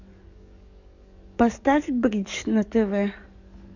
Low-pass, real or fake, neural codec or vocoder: 7.2 kHz; fake; codec, 44.1 kHz, 7.8 kbps, DAC